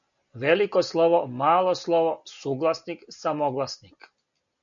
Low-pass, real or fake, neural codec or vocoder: 7.2 kHz; real; none